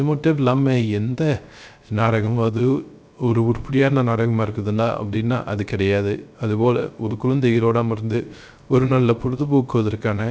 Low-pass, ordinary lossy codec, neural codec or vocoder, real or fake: none; none; codec, 16 kHz, 0.3 kbps, FocalCodec; fake